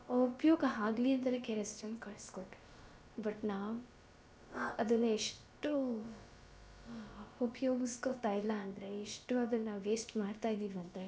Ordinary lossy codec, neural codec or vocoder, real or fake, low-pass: none; codec, 16 kHz, about 1 kbps, DyCAST, with the encoder's durations; fake; none